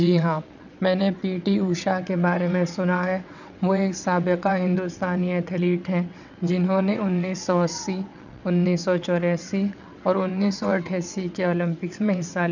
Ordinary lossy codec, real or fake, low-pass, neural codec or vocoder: none; fake; 7.2 kHz; vocoder, 22.05 kHz, 80 mel bands, WaveNeXt